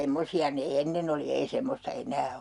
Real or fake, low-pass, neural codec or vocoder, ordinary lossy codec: real; 10.8 kHz; none; none